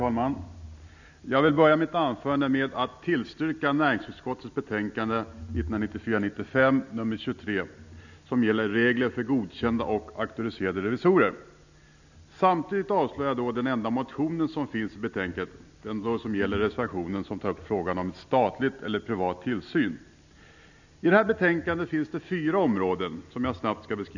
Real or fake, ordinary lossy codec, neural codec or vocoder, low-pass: real; none; none; 7.2 kHz